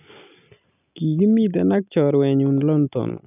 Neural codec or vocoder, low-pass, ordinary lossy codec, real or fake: none; 3.6 kHz; none; real